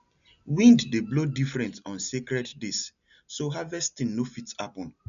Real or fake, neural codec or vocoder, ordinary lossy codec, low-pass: real; none; none; 7.2 kHz